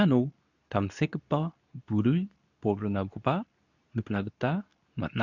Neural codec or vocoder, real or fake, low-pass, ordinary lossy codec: codec, 24 kHz, 0.9 kbps, WavTokenizer, medium speech release version 2; fake; 7.2 kHz; none